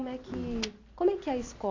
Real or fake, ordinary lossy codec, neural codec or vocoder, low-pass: real; AAC, 32 kbps; none; 7.2 kHz